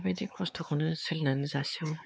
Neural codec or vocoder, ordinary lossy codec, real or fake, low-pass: codec, 16 kHz, 4 kbps, X-Codec, WavLM features, trained on Multilingual LibriSpeech; none; fake; none